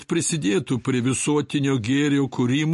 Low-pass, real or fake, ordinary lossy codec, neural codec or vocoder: 14.4 kHz; real; MP3, 48 kbps; none